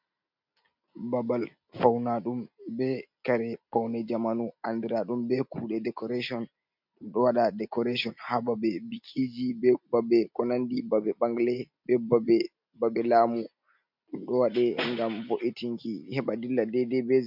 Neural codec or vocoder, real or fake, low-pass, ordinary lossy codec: none; real; 5.4 kHz; MP3, 48 kbps